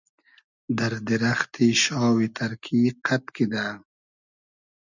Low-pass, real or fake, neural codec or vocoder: 7.2 kHz; real; none